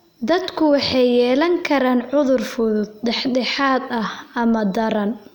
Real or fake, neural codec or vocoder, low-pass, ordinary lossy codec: real; none; 19.8 kHz; none